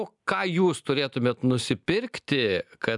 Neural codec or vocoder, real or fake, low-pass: none; real; 10.8 kHz